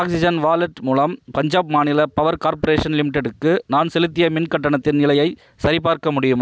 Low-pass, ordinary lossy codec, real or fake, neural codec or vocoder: none; none; real; none